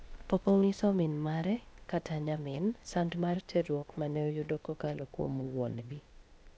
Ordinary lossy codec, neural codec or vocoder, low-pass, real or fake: none; codec, 16 kHz, 0.8 kbps, ZipCodec; none; fake